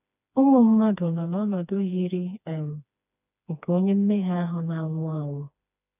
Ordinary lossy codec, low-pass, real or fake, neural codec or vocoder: none; 3.6 kHz; fake; codec, 16 kHz, 2 kbps, FreqCodec, smaller model